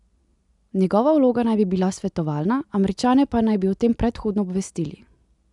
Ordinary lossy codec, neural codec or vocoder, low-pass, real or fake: none; none; 10.8 kHz; real